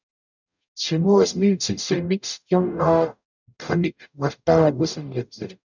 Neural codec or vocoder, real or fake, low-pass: codec, 44.1 kHz, 0.9 kbps, DAC; fake; 7.2 kHz